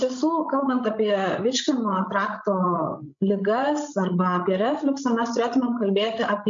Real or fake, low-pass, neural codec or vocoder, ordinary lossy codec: fake; 7.2 kHz; codec, 16 kHz, 16 kbps, FreqCodec, larger model; MP3, 48 kbps